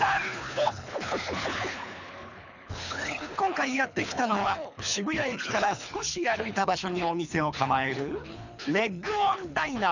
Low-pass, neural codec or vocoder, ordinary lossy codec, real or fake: 7.2 kHz; codec, 24 kHz, 3 kbps, HILCodec; none; fake